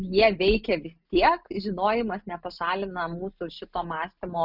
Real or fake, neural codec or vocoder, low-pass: real; none; 5.4 kHz